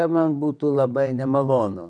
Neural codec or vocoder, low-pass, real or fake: vocoder, 22.05 kHz, 80 mel bands, WaveNeXt; 9.9 kHz; fake